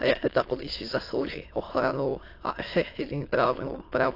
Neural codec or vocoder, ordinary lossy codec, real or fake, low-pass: autoencoder, 22.05 kHz, a latent of 192 numbers a frame, VITS, trained on many speakers; AAC, 32 kbps; fake; 5.4 kHz